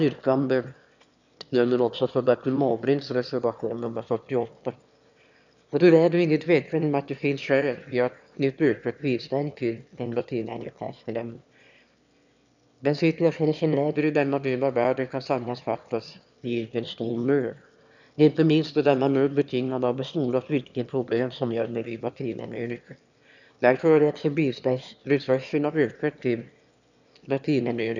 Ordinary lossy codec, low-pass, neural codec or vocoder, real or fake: none; 7.2 kHz; autoencoder, 22.05 kHz, a latent of 192 numbers a frame, VITS, trained on one speaker; fake